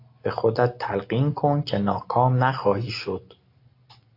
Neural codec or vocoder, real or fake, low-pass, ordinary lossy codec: none; real; 5.4 kHz; AAC, 32 kbps